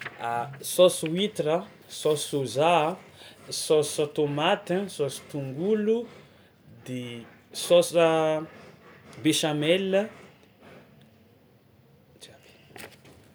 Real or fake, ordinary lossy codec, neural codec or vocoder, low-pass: real; none; none; none